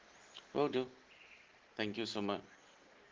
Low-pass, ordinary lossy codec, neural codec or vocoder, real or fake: 7.2 kHz; Opus, 16 kbps; none; real